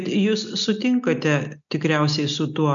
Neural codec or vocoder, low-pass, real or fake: none; 7.2 kHz; real